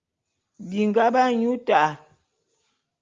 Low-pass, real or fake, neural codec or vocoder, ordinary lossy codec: 7.2 kHz; real; none; Opus, 32 kbps